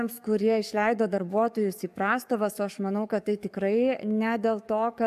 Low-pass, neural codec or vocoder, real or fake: 14.4 kHz; codec, 44.1 kHz, 7.8 kbps, DAC; fake